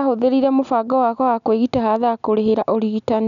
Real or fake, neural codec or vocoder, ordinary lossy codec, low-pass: real; none; none; 7.2 kHz